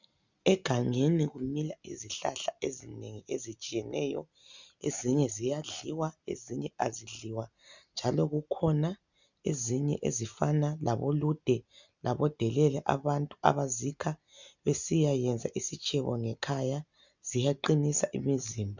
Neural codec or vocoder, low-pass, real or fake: none; 7.2 kHz; real